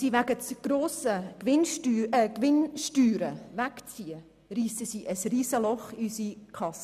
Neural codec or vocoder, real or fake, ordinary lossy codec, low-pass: none; real; none; 14.4 kHz